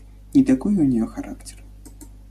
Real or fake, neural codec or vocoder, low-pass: real; none; 14.4 kHz